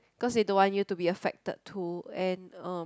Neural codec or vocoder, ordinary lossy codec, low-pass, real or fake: none; none; none; real